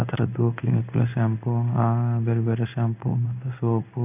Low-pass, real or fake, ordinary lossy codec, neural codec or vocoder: 3.6 kHz; fake; none; codec, 16 kHz in and 24 kHz out, 1 kbps, XY-Tokenizer